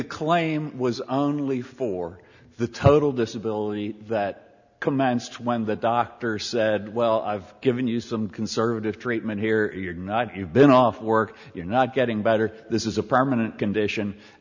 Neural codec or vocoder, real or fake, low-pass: none; real; 7.2 kHz